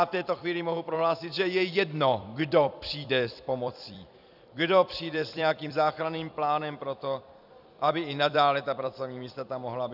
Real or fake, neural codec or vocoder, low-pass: fake; vocoder, 44.1 kHz, 128 mel bands every 256 samples, BigVGAN v2; 5.4 kHz